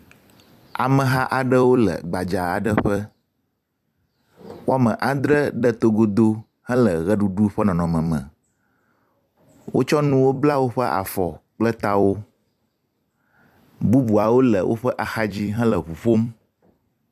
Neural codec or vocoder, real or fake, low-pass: vocoder, 44.1 kHz, 128 mel bands every 256 samples, BigVGAN v2; fake; 14.4 kHz